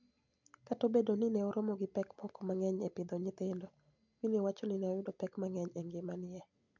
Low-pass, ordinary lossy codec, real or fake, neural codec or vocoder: 7.2 kHz; none; real; none